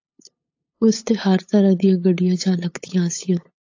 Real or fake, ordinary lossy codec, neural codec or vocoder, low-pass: fake; AAC, 48 kbps; codec, 16 kHz, 8 kbps, FunCodec, trained on LibriTTS, 25 frames a second; 7.2 kHz